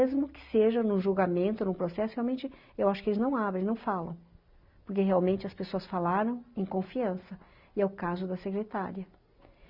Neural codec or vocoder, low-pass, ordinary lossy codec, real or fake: none; 5.4 kHz; Opus, 64 kbps; real